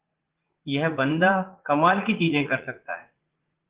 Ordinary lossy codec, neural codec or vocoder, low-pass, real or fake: Opus, 16 kbps; vocoder, 44.1 kHz, 80 mel bands, Vocos; 3.6 kHz; fake